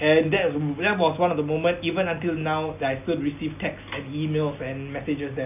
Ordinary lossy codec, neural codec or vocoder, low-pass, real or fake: none; none; 3.6 kHz; real